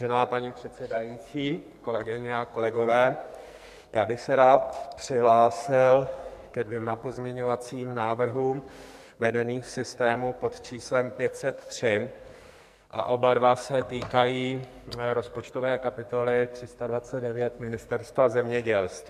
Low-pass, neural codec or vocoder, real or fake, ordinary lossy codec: 14.4 kHz; codec, 44.1 kHz, 2.6 kbps, SNAC; fake; MP3, 96 kbps